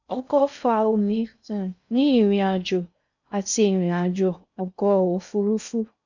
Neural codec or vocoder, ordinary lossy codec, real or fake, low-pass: codec, 16 kHz in and 24 kHz out, 0.6 kbps, FocalCodec, streaming, 2048 codes; none; fake; 7.2 kHz